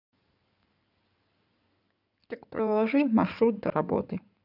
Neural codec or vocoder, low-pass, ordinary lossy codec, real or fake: codec, 16 kHz in and 24 kHz out, 2.2 kbps, FireRedTTS-2 codec; 5.4 kHz; none; fake